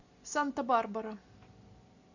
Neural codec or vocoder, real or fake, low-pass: none; real; 7.2 kHz